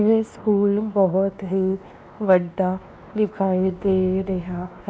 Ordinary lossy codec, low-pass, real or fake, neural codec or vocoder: none; none; fake; codec, 16 kHz, 2 kbps, X-Codec, WavLM features, trained on Multilingual LibriSpeech